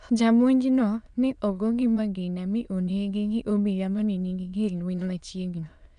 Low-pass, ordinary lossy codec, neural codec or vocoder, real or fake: 9.9 kHz; none; autoencoder, 22.05 kHz, a latent of 192 numbers a frame, VITS, trained on many speakers; fake